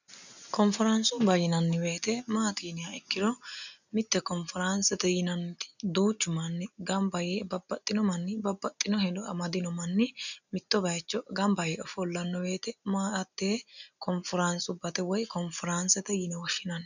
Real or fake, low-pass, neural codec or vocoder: real; 7.2 kHz; none